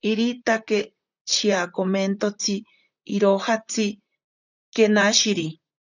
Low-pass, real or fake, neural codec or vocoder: 7.2 kHz; fake; vocoder, 44.1 kHz, 128 mel bands, Pupu-Vocoder